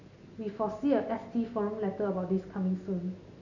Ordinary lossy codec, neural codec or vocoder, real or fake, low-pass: none; none; real; 7.2 kHz